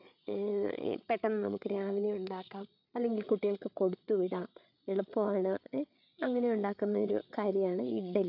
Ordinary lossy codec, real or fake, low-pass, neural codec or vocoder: none; fake; 5.4 kHz; codec, 16 kHz, 8 kbps, FreqCodec, larger model